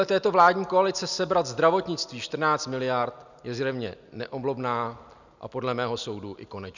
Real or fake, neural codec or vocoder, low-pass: real; none; 7.2 kHz